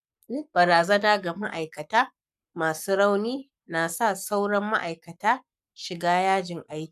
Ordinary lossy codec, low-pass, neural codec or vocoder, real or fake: none; 14.4 kHz; codec, 44.1 kHz, 7.8 kbps, Pupu-Codec; fake